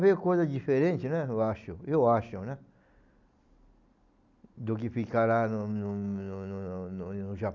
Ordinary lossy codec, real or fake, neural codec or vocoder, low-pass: none; real; none; 7.2 kHz